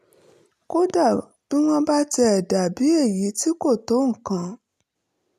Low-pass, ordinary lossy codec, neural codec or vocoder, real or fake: 14.4 kHz; none; none; real